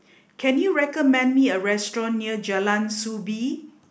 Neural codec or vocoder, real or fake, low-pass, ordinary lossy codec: none; real; none; none